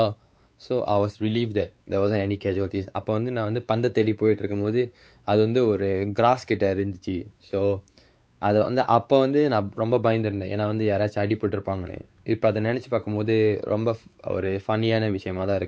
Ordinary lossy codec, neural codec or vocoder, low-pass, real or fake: none; codec, 16 kHz, 4 kbps, X-Codec, WavLM features, trained on Multilingual LibriSpeech; none; fake